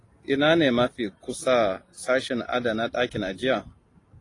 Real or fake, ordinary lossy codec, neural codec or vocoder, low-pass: real; AAC, 32 kbps; none; 10.8 kHz